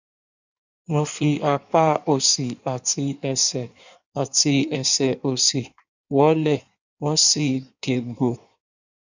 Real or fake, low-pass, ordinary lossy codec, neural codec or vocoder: fake; 7.2 kHz; none; codec, 16 kHz in and 24 kHz out, 1.1 kbps, FireRedTTS-2 codec